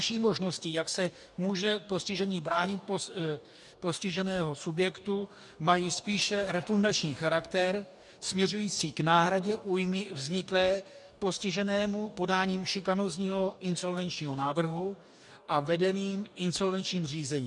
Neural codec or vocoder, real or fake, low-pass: codec, 44.1 kHz, 2.6 kbps, DAC; fake; 10.8 kHz